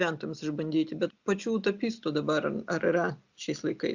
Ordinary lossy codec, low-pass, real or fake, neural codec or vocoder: Opus, 64 kbps; 7.2 kHz; real; none